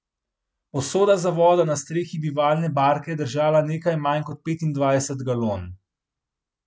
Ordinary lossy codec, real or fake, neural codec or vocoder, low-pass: none; real; none; none